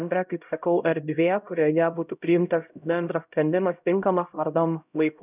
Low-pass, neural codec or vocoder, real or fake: 3.6 kHz; codec, 16 kHz, 0.5 kbps, X-Codec, HuBERT features, trained on LibriSpeech; fake